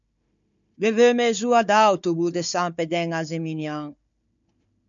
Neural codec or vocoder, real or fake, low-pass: codec, 16 kHz, 4 kbps, FunCodec, trained on Chinese and English, 50 frames a second; fake; 7.2 kHz